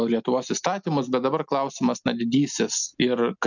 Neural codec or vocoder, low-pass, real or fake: none; 7.2 kHz; real